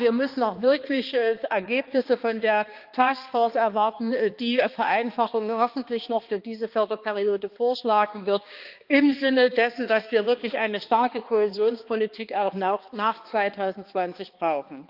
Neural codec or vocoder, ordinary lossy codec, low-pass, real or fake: codec, 16 kHz, 2 kbps, X-Codec, HuBERT features, trained on balanced general audio; Opus, 24 kbps; 5.4 kHz; fake